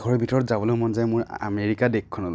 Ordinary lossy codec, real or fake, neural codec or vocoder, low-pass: none; real; none; none